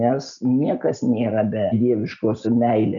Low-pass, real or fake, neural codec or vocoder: 7.2 kHz; real; none